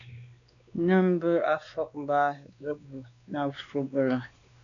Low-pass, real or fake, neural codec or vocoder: 7.2 kHz; fake; codec, 16 kHz, 2 kbps, X-Codec, WavLM features, trained on Multilingual LibriSpeech